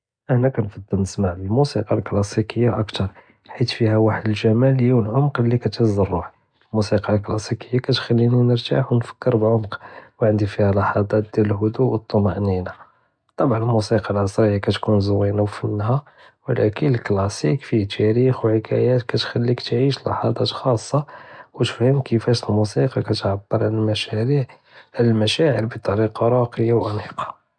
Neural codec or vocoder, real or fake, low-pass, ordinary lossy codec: none; real; 9.9 kHz; none